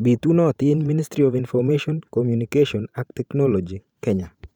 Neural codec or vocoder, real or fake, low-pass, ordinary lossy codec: vocoder, 44.1 kHz, 128 mel bands every 512 samples, BigVGAN v2; fake; 19.8 kHz; none